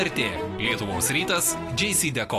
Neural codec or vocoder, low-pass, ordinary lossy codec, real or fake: none; 14.4 kHz; AAC, 48 kbps; real